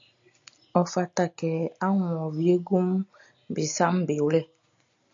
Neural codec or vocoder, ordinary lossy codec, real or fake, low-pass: none; AAC, 64 kbps; real; 7.2 kHz